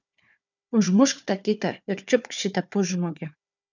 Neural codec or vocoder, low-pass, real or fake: codec, 16 kHz, 4 kbps, FunCodec, trained on Chinese and English, 50 frames a second; 7.2 kHz; fake